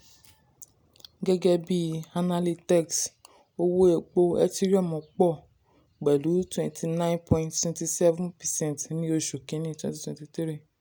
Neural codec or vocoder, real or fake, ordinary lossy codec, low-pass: none; real; none; none